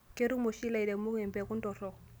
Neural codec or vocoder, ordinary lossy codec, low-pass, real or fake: none; none; none; real